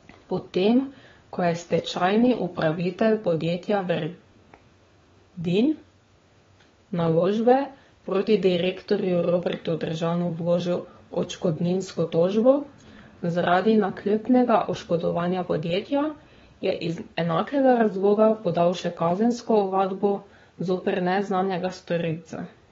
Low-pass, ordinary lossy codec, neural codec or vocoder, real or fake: 7.2 kHz; AAC, 24 kbps; codec, 16 kHz, 4 kbps, FunCodec, trained on LibriTTS, 50 frames a second; fake